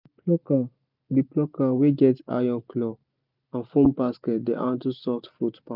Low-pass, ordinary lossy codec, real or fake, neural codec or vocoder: 5.4 kHz; none; real; none